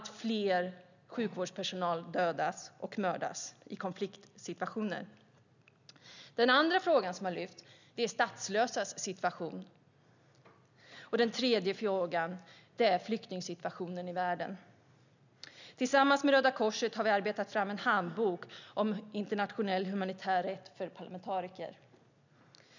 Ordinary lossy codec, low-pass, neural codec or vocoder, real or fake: none; 7.2 kHz; none; real